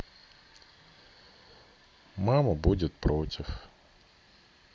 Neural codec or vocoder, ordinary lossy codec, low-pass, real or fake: none; none; none; real